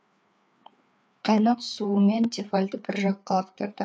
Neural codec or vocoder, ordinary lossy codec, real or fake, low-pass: codec, 16 kHz, 4 kbps, FreqCodec, larger model; none; fake; none